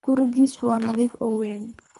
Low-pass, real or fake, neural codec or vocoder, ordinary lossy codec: 10.8 kHz; fake; codec, 24 kHz, 3 kbps, HILCodec; none